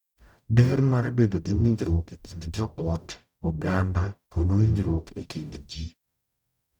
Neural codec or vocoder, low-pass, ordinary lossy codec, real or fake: codec, 44.1 kHz, 0.9 kbps, DAC; 19.8 kHz; none; fake